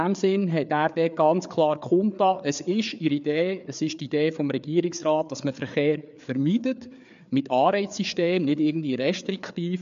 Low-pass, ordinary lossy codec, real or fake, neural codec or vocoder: 7.2 kHz; MP3, 64 kbps; fake; codec, 16 kHz, 4 kbps, FreqCodec, larger model